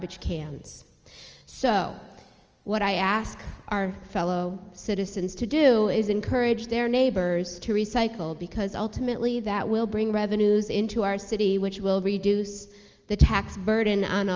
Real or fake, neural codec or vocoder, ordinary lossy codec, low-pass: real; none; Opus, 24 kbps; 7.2 kHz